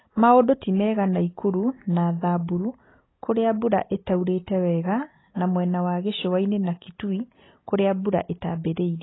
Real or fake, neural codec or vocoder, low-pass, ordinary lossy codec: real; none; 7.2 kHz; AAC, 16 kbps